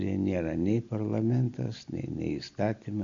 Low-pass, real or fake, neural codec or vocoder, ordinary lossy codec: 7.2 kHz; real; none; AAC, 64 kbps